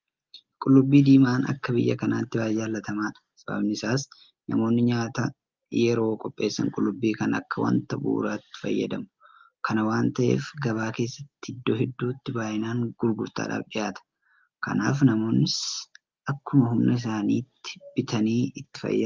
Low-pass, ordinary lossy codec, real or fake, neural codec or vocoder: 7.2 kHz; Opus, 32 kbps; real; none